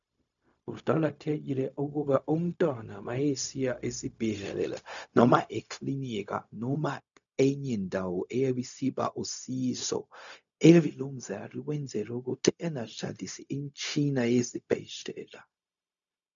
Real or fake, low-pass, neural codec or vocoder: fake; 7.2 kHz; codec, 16 kHz, 0.4 kbps, LongCat-Audio-Codec